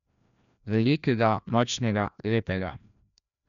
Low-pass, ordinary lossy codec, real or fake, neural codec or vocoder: 7.2 kHz; none; fake; codec, 16 kHz, 1 kbps, FreqCodec, larger model